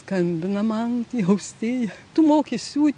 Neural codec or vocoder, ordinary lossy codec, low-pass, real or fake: none; AAC, 64 kbps; 9.9 kHz; real